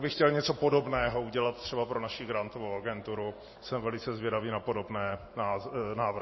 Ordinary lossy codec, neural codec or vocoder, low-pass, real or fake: MP3, 24 kbps; none; 7.2 kHz; real